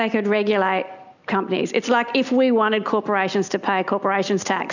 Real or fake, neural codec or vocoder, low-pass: real; none; 7.2 kHz